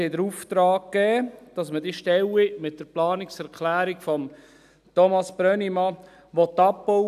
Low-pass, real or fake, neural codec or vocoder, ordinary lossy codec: 14.4 kHz; real; none; none